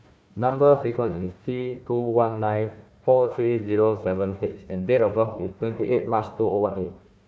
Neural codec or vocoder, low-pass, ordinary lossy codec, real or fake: codec, 16 kHz, 1 kbps, FunCodec, trained on Chinese and English, 50 frames a second; none; none; fake